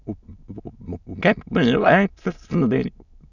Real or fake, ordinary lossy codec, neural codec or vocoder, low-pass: fake; none; autoencoder, 22.05 kHz, a latent of 192 numbers a frame, VITS, trained on many speakers; 7.2 kHz